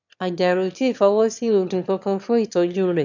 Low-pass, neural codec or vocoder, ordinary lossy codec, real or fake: 7.2 kHz; autoencoder, 22.05 kHz, a latent of 192 numbers a frame, VITS, trained on one speaker; none; fake